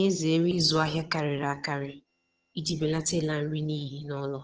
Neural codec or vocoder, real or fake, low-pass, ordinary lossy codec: vocoder, 22.05 kHz, 80 mel bands, HiFi-GAN; fake; 7.2 kHz; Opus, 16 kbps